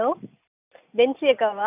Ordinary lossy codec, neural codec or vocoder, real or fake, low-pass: none; none; real; 3.6 kHz